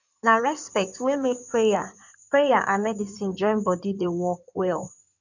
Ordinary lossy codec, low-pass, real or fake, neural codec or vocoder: none; 7.2 kHz; fake; codec, 16 kHz in and 24 kHz out, 2.2 kbps, FireRedTTS-2 codec